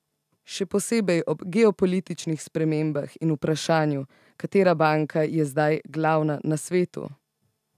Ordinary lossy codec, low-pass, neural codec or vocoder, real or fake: none; 14.4 kHz; none; real